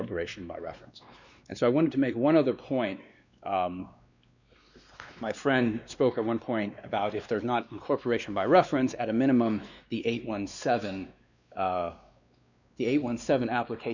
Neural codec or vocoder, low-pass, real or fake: codec, 16 kHz, 2 kbps, X-Codec, WavLM features, trained on Multilingual LibriSpeech; 7.2 kHz; fake